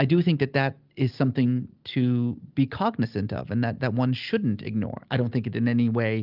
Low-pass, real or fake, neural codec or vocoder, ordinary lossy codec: 5.4 kHz; real; none; Opus, 32 kbps